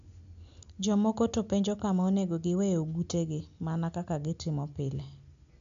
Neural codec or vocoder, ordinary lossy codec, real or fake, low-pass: none; AAC, 64 kbps; real; 7.2 kHz